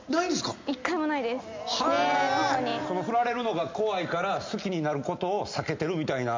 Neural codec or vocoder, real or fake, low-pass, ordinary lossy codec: none; real; 7.2 kHz; MP3, 64 kbps